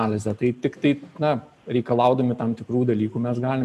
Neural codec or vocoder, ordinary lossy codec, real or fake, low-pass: none; MP3, 96 kbps; real; 14.4 kHz